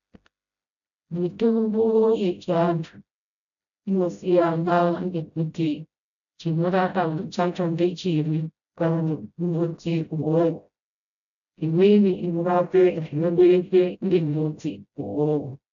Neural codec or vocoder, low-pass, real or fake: codec, 16 kHz, 0.5 kbps, FreqCodec, smaller model; 7.2 kHz; fake